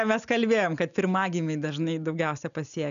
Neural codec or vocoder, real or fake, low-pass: none; real; 7.2 kHz